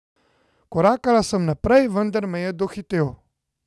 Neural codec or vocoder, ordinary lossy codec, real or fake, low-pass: none; none; real; none